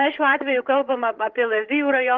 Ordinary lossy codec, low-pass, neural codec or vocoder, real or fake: Opus, 16 kbps; 7.2 kHz; codec, 16 kHz, 16 kbps, FreqCodec, larger model; fake